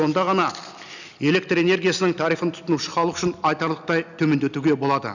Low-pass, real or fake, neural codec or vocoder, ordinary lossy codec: 7.2 kHz; real; none; none